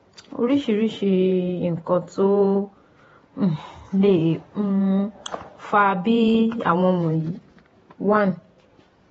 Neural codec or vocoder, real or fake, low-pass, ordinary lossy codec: vocoder, 48 kHz, 128 mel bands, Vocos; fake; 19.8 kHz; AAC, 24 kbps